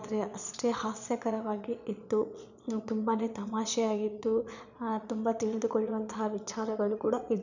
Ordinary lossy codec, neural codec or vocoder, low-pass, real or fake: none; vocoder, 22.05 kHz, 80 mel bands, Vocos; 7.2 kHz; fake